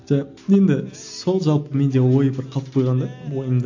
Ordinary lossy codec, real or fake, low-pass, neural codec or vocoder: AAC, 48 kbps; real; 7.2 kHz; none